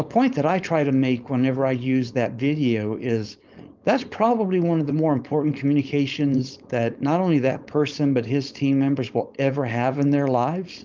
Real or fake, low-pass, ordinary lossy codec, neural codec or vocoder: fake; 7.2 kHz; Opus, 24 kbps; codec, 16 kHz, 4.8 kbps, FACodec